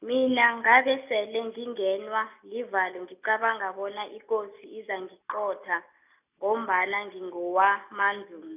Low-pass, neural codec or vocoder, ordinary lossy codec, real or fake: 3.6 kHz; none; none; real